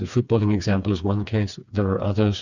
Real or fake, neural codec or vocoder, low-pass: fake; codec, 16 kHz, 4 kbps, FreqCodec, smaller model; 7.2 kHz